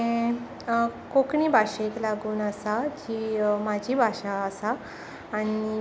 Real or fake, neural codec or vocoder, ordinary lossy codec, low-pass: real; none; none; none